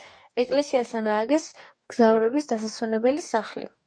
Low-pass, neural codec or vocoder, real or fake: 9.9 kHz; codec, 44.1 kHz, 2.6 kbps, DAC; fake